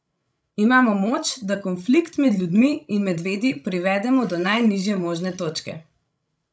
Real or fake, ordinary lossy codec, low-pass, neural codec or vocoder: fake; none; none; codec, 16 kHz, 16 kbps, FreqCodec, larger model